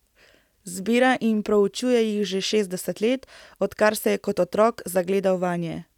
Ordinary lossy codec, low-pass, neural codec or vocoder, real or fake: none; 19.8 kHz; none; real